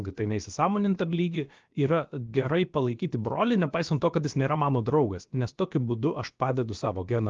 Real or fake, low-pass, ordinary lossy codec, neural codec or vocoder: fake; 7.2 kHz; Opus, 32 kbps; codec, 16 kHz, about 1 kbps, DyCAST, with the encoder's durations